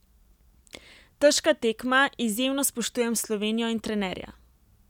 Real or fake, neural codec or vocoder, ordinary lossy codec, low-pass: real; none; none; 19.8 kHz